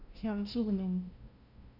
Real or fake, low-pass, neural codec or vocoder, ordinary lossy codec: fake; 5.4 kHz; codec, 16 kHz, 0.5 kbps, FunCodec, trained on LibriTTS, 25 frames a second; none